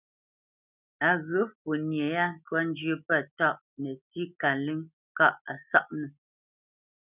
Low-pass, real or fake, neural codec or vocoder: 3.6 kHz; real; none